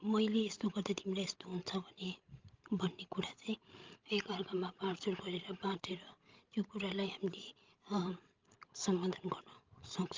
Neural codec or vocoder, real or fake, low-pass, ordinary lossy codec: codec, 16 kHz, 16 kbps, FreqCodec, larger model; fake; 7.2 kHz; Opus, 32 kbps